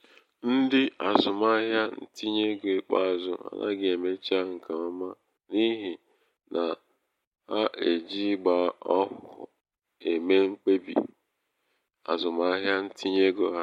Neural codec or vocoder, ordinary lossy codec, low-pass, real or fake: none; MP3, 64 kbps; 19.8 kHz; real